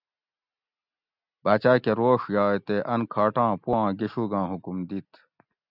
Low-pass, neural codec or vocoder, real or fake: 5.4 kHz; none; real